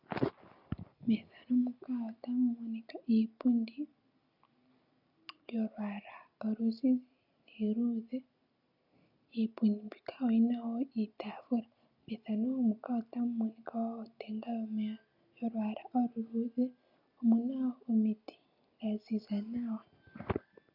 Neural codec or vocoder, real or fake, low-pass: none; real; 5.4 kHz